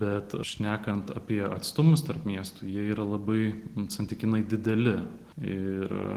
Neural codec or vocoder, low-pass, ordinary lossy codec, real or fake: none; 14.4 kHz; Opus, 16 kbps; real